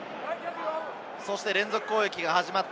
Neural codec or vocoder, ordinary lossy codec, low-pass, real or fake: none; none; none; real